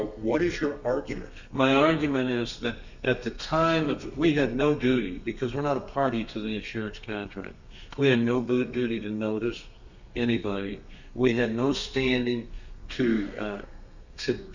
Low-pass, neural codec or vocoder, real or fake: 7.2 kHz; codec, 32 kHz, 1.9 kbps, SNAC; fake